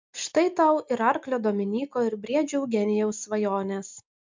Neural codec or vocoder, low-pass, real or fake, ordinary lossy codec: none; 7.2 kHz; real; MP3, 64 kbps